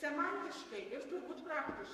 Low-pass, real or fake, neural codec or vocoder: 14.4 kHz; fake; codec, 44.1 kHz, 7.8 kbps, Pupu-Codec